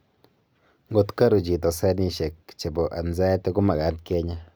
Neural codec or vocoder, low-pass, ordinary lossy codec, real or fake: none; none; none; real